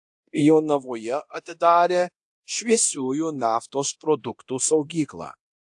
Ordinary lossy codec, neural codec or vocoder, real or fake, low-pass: AAC, 64 kbps; codec, 24 kHz, 0.9 kbps, DualCodec; fake; 10.8 kHz